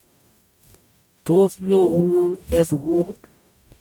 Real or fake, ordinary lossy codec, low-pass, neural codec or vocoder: fake; none; 19.8 kHz; codec, 44.1 kHz, 0.9 kbps, DAC